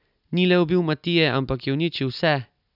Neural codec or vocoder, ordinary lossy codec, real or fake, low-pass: none; none; real; 5.4 kHz